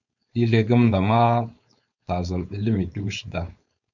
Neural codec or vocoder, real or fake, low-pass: codec, 16 kHz, 4.8 kbps, FACodec; fake; 7.2 kHz